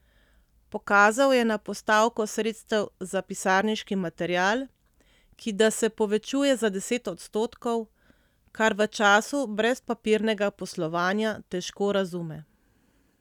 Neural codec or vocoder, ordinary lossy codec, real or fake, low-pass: none; none; real; 19.8 kHz